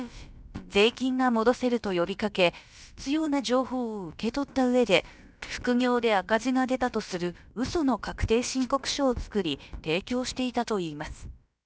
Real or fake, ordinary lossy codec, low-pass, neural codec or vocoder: fake; none; none; codec, 16 kHz, about 1 kbps, DyCAST, with the encoder's durations